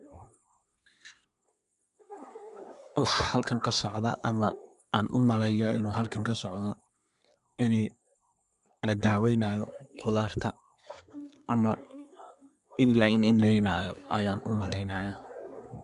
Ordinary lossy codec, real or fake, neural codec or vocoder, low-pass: none; fake; codec, 24 kHz, 1 kbps, SNAC; 10.8 kHz